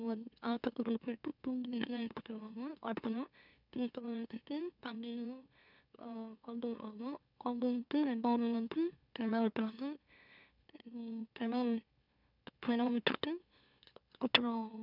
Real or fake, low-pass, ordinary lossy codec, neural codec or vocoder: fake; 5.4 kHz; none; autoencoder, 44.1 kHz, a latent of 192 numbers a frame, MeloTTS